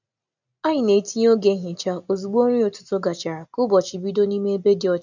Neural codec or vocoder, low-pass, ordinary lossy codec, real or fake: none; 7.2 kHz; none; real